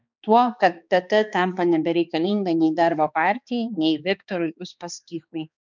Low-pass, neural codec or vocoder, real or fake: 7.2 kHz; codec, 16 kHz, 2 kbps, X-Codec, HuBERT features, trained on balanced general audio; fake